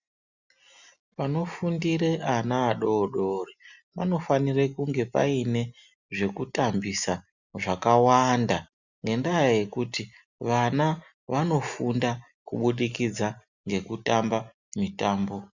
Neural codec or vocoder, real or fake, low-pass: none; real; 7.2 kHz